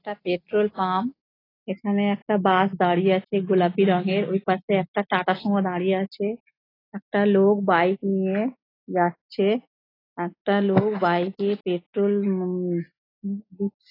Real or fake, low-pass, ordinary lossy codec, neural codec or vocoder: real; 5.4 kHz; AAC, 24 kbps; none